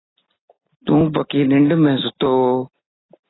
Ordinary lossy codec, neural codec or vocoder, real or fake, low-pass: AAC, 16 kbps; none; real; 7.2 kHz